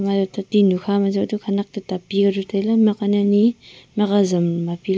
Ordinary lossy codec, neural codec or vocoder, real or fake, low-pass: none; none; real; none